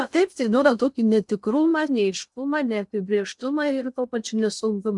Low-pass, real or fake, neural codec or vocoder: 10.8 kHz; fake; codec, 16 kHz in and 24 kHz out, 0.6 kbps, FocalCodec, streaming, 2048 codes